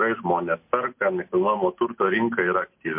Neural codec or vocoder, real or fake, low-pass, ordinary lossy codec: none; real; 3.6 kHz; MP3, 32 kbps